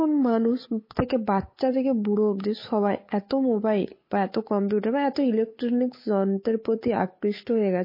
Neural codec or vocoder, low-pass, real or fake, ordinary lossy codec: codec, 16 kHz, 8 kbps, FunCodec, trained on Chinese and English, 25 frames a second; 5.4 kHz; fake; MP3, 24 kbps